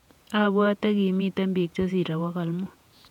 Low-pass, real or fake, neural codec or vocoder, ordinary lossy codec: 19.8 kHz; fake; vocoder, 48 kHz, 128 mel bands, Vocos; none